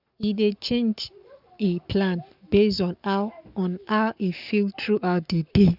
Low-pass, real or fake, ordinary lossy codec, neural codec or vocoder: 5.4 kHz; fake; none; codec, 44.1 kHz, 7.8 kbps, Pupu-Codec